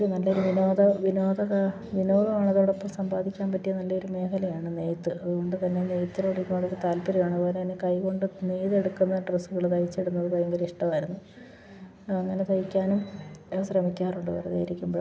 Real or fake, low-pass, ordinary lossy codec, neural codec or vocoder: real; none; none; none